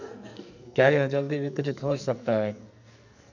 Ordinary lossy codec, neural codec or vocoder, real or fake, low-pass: none; codec, 44.1 kHz, 2.6 kbps, SNAC; fake; 7.2 kHz